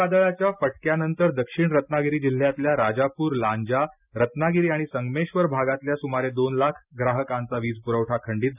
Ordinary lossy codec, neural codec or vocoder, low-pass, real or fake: none; none; 3.6 kHz; real